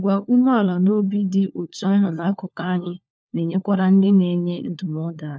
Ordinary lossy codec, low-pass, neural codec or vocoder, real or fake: none; none; codec, 16 kHz, 4 kbps, FunCodec, trained on LibriTTS, 50 frames a second; fake